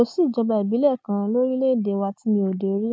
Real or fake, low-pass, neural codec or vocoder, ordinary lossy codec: real; none; none; none